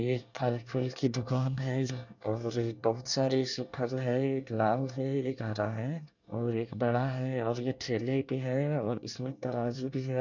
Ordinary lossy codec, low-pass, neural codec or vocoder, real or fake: none; 7.2 kHz; codec, 24 kHz, 1 kbps, SNAC; fake